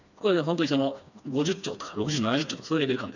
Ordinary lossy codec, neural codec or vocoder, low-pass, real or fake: none; codec, 16 kHz, 2 kbps, FreqCodec, smaller model; 7.2 kHz; fake